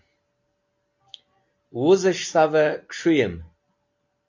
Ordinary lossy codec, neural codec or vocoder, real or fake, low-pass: AAC, 48 kbps; none; real; 7.2 kHz